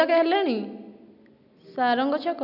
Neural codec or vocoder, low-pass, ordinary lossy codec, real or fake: none; 5.4 kHz; none; real